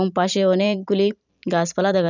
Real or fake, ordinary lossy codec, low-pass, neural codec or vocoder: fake; none; 7.2 kHz; vocoder, 44.1 kHz, 128 mel bands every 512 samples, BigVGAN v2